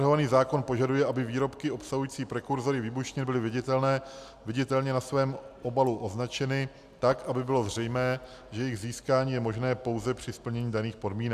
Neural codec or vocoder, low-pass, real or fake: none; 14.4 kHz; real